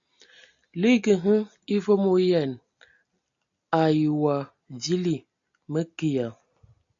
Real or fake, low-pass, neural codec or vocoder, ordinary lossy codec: real; 7.2 kHz; none; AAC, 64 kbps